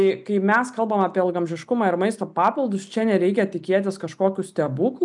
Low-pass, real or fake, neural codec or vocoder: 10.8 kHz; real; none